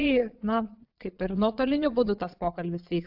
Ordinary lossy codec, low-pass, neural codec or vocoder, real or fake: AAC, 48 kbps; 5.4 kHz; vocoder, 22.05 kHz, 80 mel bands, Vocos; fake